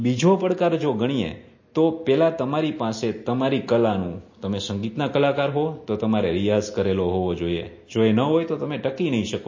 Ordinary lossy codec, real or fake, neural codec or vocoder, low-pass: MP3, 32 kbps; real; none; 7.2 kHz